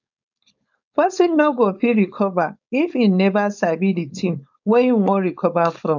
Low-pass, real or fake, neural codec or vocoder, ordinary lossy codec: 7.2 kHz; fake; codec, 16 kHz, 4.8 kbps, FACodec; none